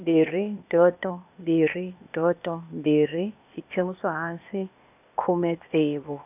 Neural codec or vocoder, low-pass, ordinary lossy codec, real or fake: codec, 16 kHz, 0.8 kbps, ZipCodec; 3.6 kHz; none; fake